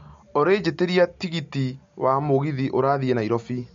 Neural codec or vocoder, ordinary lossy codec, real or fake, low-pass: none; MP3, 48 kbps; real; 7.2 kHz